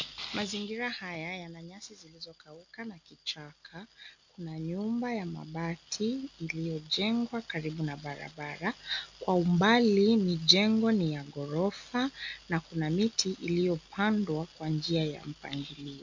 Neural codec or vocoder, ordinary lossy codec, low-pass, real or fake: none; MP3, 48 kbps; 7.2 kHz; real